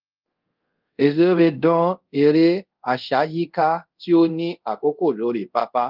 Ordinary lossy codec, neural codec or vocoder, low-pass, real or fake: Opus, 32 kbps; codec, 24 kHz, 0.5 kbps, DualCodec; 5.4 kHz; fake